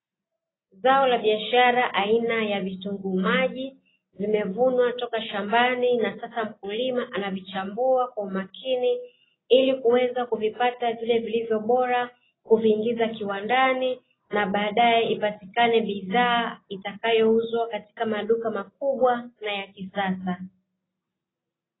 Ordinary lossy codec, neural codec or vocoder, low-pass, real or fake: AAC, 16 kbps; none; 7.2 kHz; real